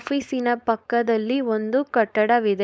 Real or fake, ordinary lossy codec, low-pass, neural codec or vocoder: fake; none; none; codec, 16 kHz, 4.8 kbps, FACodec